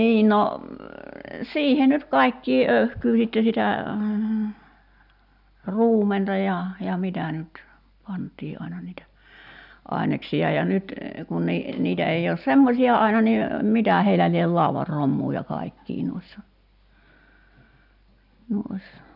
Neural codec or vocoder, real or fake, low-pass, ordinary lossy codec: none; real; 5.4 kHz; none